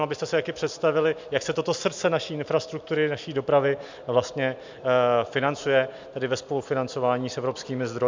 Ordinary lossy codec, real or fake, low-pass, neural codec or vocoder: MP3, 64 kbps; real; 7.2 kHz; none